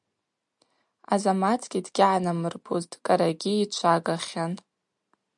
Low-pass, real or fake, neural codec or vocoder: 10.8 kHz; real; none